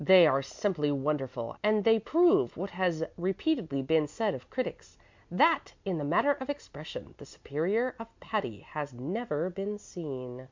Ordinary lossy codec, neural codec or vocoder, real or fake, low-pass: MP3, 64 kbps; none; real; 7.2 kHz